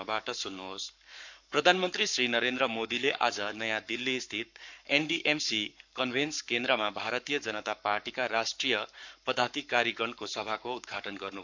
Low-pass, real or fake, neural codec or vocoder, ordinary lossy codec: 7.2 kHz; fake; codec, 44.1 kHz, 7.8 kbps, Pupu-Codec; none